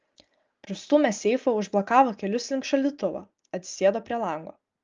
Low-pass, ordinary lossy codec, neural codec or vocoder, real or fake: 7.2 kHz; Opus, 24 kbps; none; real